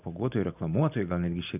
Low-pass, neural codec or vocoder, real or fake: 3.6 kHz; none; real